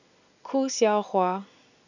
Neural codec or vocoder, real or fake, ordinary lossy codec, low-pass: none; real; none; 7.2 kHz